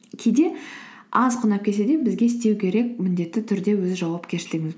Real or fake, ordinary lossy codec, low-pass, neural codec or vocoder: real; none; none; none